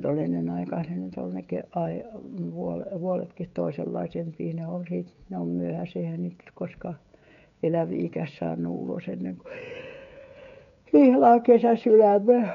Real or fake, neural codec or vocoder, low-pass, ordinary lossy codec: fake; codec, 16 kHz, 16 kbps, FreqCodec, smaller model; 7.2 kHz; none